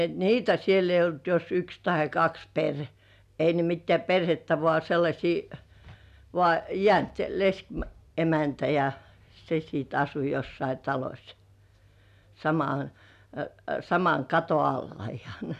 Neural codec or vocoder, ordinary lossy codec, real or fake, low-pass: none; none; real; 14.4 kHz